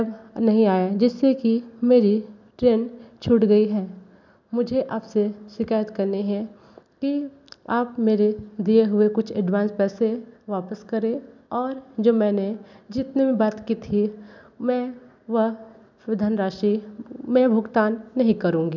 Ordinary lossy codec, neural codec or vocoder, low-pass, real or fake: none; none; 7.2 kHz; real